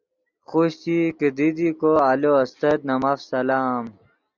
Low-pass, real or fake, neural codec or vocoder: 7.2 kHz; real; none